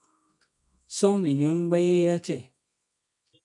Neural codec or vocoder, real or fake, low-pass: codec, 24 kHz, 0.9 kbps, WavTokenizer, medium music audio release; fake; 10.8 kHz